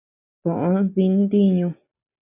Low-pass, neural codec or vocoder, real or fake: 3.6 kHz; none; real